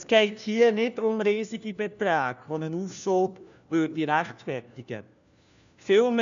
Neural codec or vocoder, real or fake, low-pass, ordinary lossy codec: codec, 16 kHz, 1 kbps, FunCodec, trained on LibriTTS, 50 frames a second; fake; 7.2 kHz; none